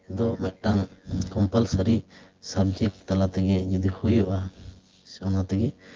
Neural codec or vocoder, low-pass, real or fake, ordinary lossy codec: vocoder, 24 kHz, 100 mel bands, Vocos; 7.2 kHz; fake; Opus, 16 kbps